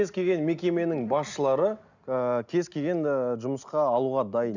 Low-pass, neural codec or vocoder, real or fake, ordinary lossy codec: 7.2 kHz; none; real; none